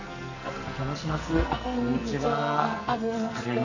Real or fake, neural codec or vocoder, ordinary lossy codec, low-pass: fake; codec, 44.1 kHz, 2.6 kbps, SNAC; none; 7.2 kHz